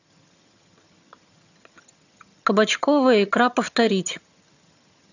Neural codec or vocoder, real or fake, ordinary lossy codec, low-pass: vocoder, 22.05 kHz, 80 mel bands, HiFi-GAN; fake; none; 7.2 kHz